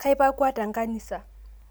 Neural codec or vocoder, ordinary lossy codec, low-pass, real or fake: none; none; none; real